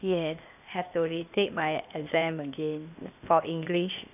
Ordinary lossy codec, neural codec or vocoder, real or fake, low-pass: none; codec, 16 kHz, 0.8 kbps, ZipCodec; fake; 3.6 kHz